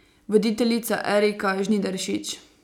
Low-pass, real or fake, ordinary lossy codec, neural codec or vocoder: 19.8 kHz; real; none; none